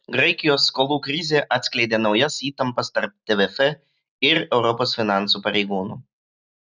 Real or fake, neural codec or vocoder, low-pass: real; none; 7.2 kHz